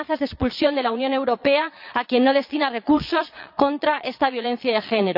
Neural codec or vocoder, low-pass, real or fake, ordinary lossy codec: vocoder, 22.05 kHz, 80 mel bands, Vocos; 5.4 kHz; fake; none